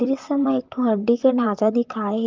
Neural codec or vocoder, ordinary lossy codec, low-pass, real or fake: vocoder, 44.1 kHz, 128 mel bands, Pupu-Vocoder; Opus, 32 kbps; 7.2 kHz; fake